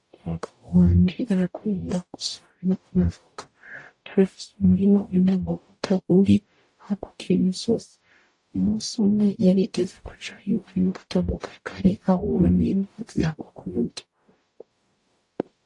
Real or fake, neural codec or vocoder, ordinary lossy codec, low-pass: fake; codec, 44.1 kHz, 0.9 kbps, DAC; AAC, 48 kbps; 10.8 kHz